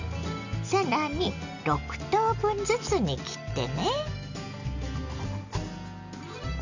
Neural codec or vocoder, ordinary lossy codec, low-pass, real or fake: none; none; 7.2 kHz; real